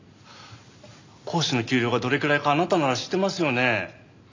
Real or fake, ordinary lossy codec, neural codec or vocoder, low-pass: real; MP3, 64 kbps; none; 7.2 kHz